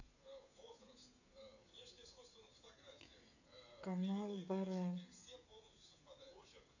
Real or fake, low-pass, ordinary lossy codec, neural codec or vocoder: fake; 7.2 kHz; none; codec, 16 kHz, 16 kbps, FreqCodec, smaller model